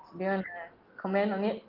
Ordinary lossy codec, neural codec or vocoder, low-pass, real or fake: Opus, 32 kbps; none; 5.4 kHz; real